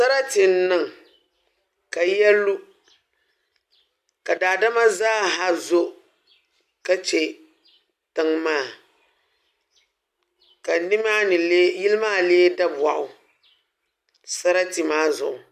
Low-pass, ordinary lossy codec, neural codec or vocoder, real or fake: 14.4 kHz; MP3, 96 kbps; none; real